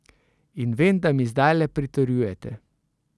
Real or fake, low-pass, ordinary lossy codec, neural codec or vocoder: real; none; none; none